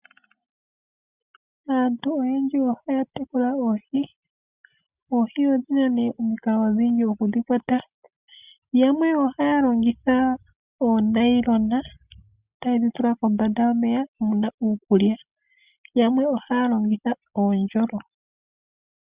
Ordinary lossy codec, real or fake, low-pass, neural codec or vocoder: Opus, 64 kbps; real; 3.6 kHz; none